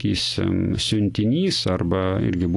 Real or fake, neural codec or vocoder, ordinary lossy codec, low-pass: real; none; AAC, 48 kbps; 10.8 kHz